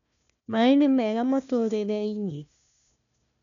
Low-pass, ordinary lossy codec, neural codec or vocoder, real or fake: 7.2 kHz; none; codec, 16 kHz, 1 kbps, FunCodec, trained on Chinese and English, 50 frames a second; fake